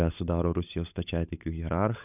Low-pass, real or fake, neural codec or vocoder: 3.6 kHz; fake; codec, 16 kHz, 16 kbps, FunCodec, trained on LibriTTS, 50 frames a second